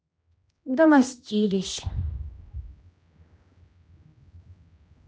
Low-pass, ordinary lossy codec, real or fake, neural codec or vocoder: none; none; fake; codec, 16 kHz, 1 kbps, X-Codec, HuBERT features, trained on general audio